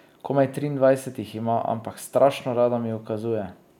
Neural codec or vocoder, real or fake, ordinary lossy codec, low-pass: none; real; none; 19.8 kHz